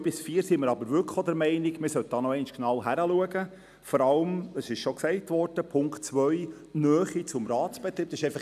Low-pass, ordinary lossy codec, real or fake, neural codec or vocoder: 14.4 kHz; none; real; none